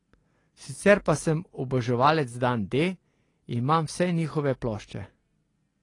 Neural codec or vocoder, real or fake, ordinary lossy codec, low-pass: none; real; AAC, 32 kbps; 10.8 kHz